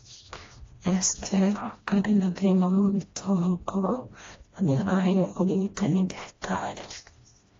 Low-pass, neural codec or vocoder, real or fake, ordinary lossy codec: 7.2 kHz; codec, 16 kHz, 1 kbps, FreqCodec, smaller model; fake; AAC, 32 kbps